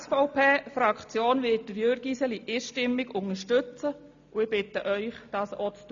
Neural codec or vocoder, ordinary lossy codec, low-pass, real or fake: none; AAC, 64 kbps; 7.2 kHz; real